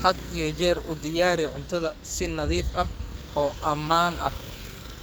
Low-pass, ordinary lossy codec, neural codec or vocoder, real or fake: none; none; codec, 44.1 kHz, 2.6 kbps, SNAC; fake